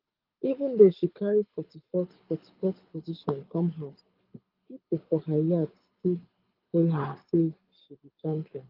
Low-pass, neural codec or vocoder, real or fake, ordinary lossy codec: 5.4 kHz; codec, 24 kHz, 6 kbps, HILCodec; fake; Opus, 24 kbps